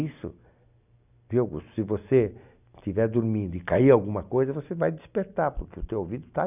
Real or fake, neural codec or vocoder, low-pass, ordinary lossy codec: real; none; 3.6 kHz; none